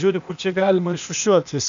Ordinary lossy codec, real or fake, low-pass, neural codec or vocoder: MP3, 64 kbps; fake; 7.2 kHz; codec, 16 kHz, 0.8 kbps, ZipCodec